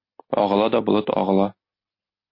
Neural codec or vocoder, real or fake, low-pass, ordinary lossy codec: none; real; 5.4 kHz; MP3, 32 kbps